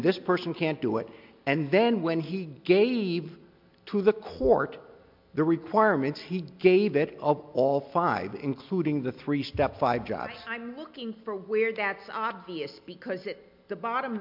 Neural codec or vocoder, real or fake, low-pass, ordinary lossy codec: none; real; 5.4 kHz; MP3, 48 kbps